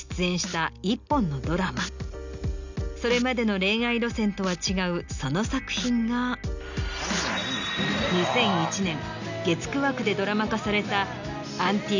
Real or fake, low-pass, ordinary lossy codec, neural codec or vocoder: real; 7.2 kHz; none; none